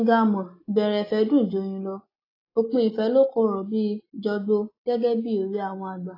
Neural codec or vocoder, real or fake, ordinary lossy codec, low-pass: none; real; AAC, 24 kbps; 5.4 kHz